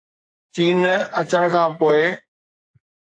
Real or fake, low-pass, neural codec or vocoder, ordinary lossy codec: fake; 9.9 kHz; codec, 44.1 kHz, 2.6 kbps, SNAC; AAC, 48 kbps